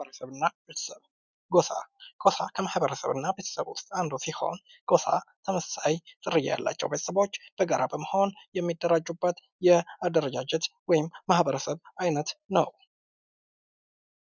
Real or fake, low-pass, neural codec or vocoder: real; 7.2 kHz; none